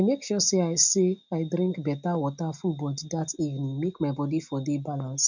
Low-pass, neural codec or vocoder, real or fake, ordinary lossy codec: 7.2 kHz; none; real; none